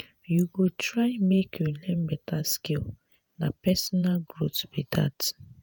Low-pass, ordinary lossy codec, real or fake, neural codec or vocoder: none; none; fake; vocoder, 48 kHz, 128 mel bands, Vocos